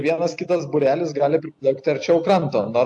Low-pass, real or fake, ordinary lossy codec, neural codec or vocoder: 10.8 kHz; real; AAC, 64 kbps; none